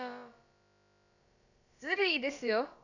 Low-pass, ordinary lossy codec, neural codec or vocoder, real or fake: 7.2 kHz; none; codec, 16 kHz, about 1 kbps, DyCAST, with the encoder's durations; fake